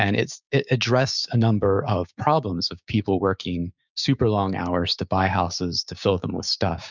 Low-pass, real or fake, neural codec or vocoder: 7.2 kHz; fake; codec, 16 kHz, 6 kbps, DAC